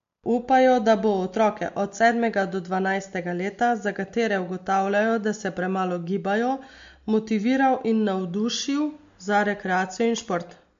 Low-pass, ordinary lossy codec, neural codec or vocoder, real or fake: 7.2 kHz; MP3, 48 kbps; none; real